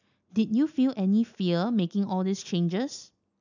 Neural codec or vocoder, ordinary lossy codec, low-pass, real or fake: none; none; 7.2 kHz; real